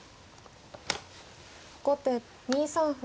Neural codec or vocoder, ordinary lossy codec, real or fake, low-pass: none; none; real; none